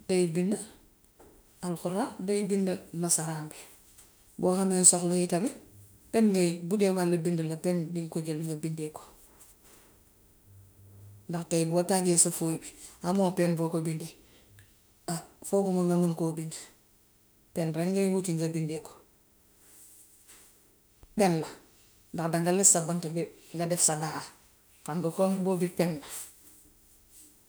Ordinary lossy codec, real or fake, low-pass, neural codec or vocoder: none; fake; none; autoencoder, 48 kHz, 32 numbers a frame, DAC-VAE, trained on Japanese speech